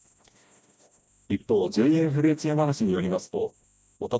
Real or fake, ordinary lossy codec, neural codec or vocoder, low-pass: fake; none; codec, 16 kHz, 1 kbps, FreqCodec, smaller model; none